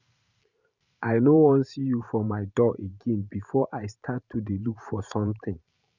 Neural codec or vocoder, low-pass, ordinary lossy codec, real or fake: vocoder, 44.1 kHz, 128 mel bands every 512 samples, BigVGAN v2; 7.2 kHz; none; fake